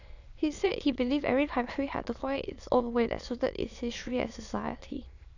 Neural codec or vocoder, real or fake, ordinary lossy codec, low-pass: autoencoder, 22.05 kHz, a latent of 192 numbers a frame, VITS, trained on many speakers; fake; none; 7.2 kHz